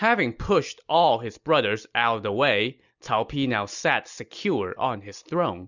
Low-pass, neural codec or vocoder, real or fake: 7.2 kHz; none; real